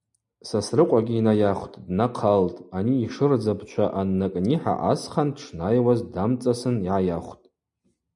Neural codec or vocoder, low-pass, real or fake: none; 10.8 kHz; real